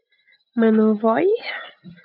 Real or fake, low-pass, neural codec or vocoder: real; 5.4 kHz; none